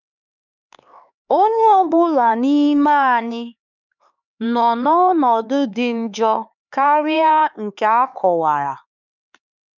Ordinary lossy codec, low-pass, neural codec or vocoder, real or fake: none; 7.2 kHz; codec, 16 kHz, 4 kbps, X-Codec, HuBERT features, trained on LibriSpeech; fake